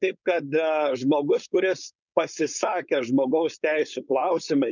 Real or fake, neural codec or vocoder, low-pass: fake; codec, 16 kHz, 4.8 kbps, FACodec; 7.2 kHz